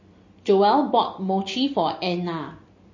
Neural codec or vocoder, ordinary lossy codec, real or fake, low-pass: none; MP3, 32 kbps; real; 7.2 kHz